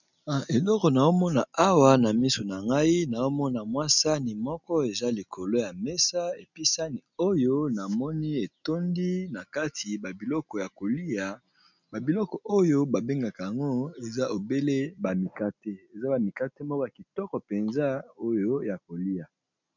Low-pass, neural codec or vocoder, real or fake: 7.2 kHz; none; real